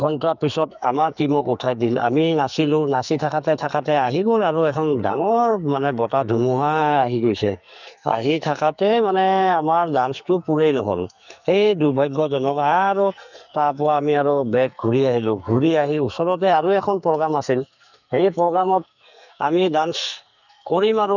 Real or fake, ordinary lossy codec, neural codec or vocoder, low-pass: fake; none; codec, 44.1 kHz, 2.6 kbps, SNAC; 7.2 kHz